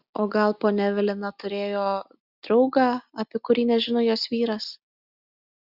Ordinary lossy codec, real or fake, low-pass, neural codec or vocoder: Opus, 64 kbps; real; 5.4 kHz; none